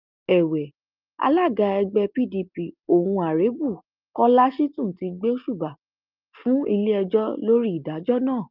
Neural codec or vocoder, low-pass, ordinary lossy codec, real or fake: none; 5.4 kHz; Opus, 24 kbps; real